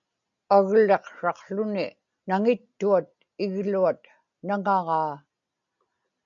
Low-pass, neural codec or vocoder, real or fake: 7.2 kHz; none; real